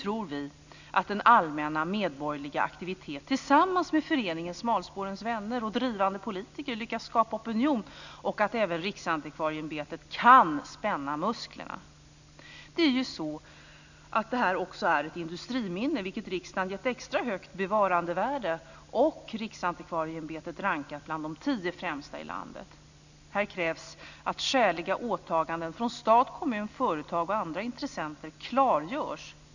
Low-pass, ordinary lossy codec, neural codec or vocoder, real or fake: 7.2 kHz; none; none; real